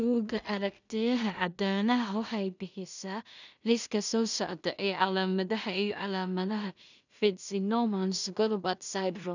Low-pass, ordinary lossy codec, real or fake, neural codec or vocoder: 7.2 kHz; none; fake; codec, 16 kHz in and 24 kHz out, 0.4 kbps, LongCat-Audio-Codec, two codebook decoder